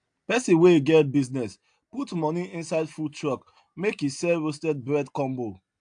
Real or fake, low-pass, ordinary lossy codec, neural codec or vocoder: real; 9.9 kHz; AAC, 64 kbps; none